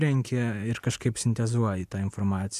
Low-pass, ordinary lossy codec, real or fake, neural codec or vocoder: 14.4 kHz; MP3, 96 kbps; real; none